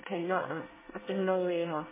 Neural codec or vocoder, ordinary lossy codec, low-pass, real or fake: codec, 24 kHz, 1 kbps, SNAC; MP3, 16 kbps; 3.6 kHz; fake